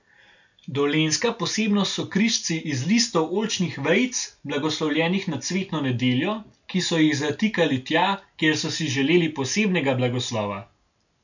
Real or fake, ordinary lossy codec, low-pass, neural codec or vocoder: real; none; 7.2 kHz; none